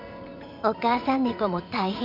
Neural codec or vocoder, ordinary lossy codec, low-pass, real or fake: vocoder, 44.1 kHz, 80 mel bands, Vocos; none; 5.4 kHz; fake